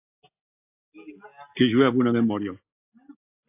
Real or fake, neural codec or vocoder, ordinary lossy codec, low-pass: real; none; AAC, 24 kbps; 3.6 kHz